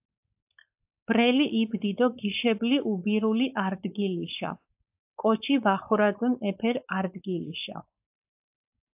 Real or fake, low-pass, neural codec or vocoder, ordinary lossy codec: fake; 3.6 kHz; codec, 16 kHz, 4.8 kbps, FACodec; AAC, 32 kbps